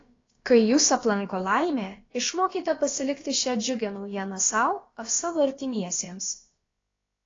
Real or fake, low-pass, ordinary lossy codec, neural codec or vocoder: fake; 7.2 kHz; AAC, 32 kbps; codec, 16 kHz, about 1 kbps, DyCAST, with the encoder's durations